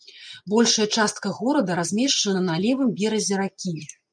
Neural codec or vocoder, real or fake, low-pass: none; real; 9.9 kHz